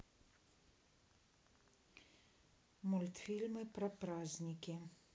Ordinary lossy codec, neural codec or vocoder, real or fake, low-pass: none; none; real; none